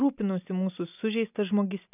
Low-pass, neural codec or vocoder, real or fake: 3.6 kHz; none; real